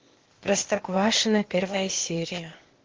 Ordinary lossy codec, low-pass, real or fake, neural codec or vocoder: Opus, 16 kbps; 7.2 kHz; fake; codec, 16 kHz, 0.8 kbps, ZipCodec